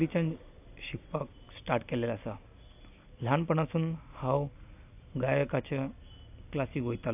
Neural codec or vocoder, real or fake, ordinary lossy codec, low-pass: none; real; AAC, 32 kbps; 3.6 kHz